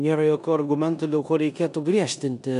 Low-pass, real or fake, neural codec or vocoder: 10.8 kHz; fake; codec, 16 kHz in and 24 kHz out, 0.9 kbps, LongCat-Audio-Codec, four codebook decoder